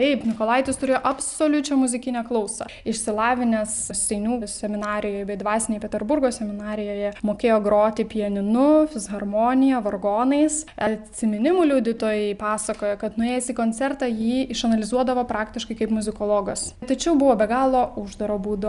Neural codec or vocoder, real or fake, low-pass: none; real; 10.8 kHz